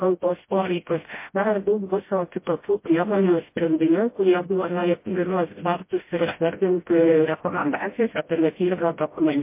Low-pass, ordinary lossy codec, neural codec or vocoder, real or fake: 3.6 kHz; MP3, 24 kbps; codec, 16 kHz, 0.5 kbps, FreqCodec, smaller model; fake